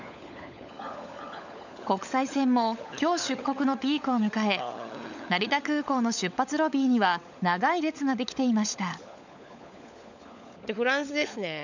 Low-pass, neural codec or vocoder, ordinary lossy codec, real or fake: 7.2 kHz; codec, 16 kHz, 16 kbps, FunCodec, trained on LibriTTS, 50 frames a second; none; fake